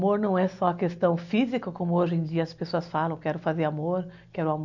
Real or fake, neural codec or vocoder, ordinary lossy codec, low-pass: real; none; none; 7.2 kHz